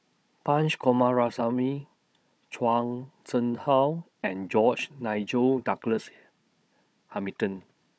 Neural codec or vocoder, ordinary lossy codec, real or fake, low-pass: codec, 16 kHz, 16 kbps, FunCodec, trained on Chinese and English, 50 frames a second; none; fake; none